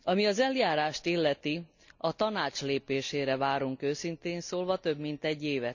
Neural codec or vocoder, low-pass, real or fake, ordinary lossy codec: none; 7.2 kHz; real; none